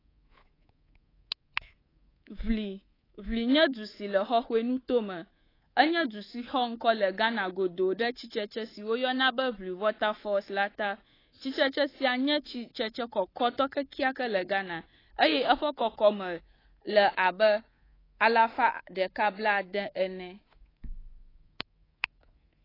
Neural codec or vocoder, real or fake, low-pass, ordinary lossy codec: codec, 24 kHz, 3.1 kbps, DualCodec; fake; 5.4 kHz; AAC, 24 kbps